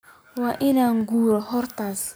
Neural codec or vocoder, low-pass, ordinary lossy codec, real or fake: none; none; none; real